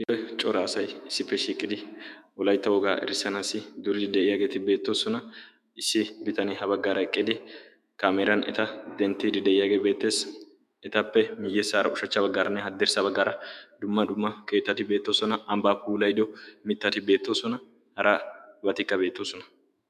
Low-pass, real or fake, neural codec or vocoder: 14.4 kHz; fake; autoencoder, 48 kHz, 128 numbers a frame, DAC-VAE, trained on Japanese speech